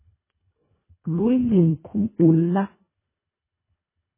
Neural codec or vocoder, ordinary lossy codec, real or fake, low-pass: codec, 24 kHz, 1.5 kbps, HILCodec; MP3, 16 kbps; fake; 3.6 kHz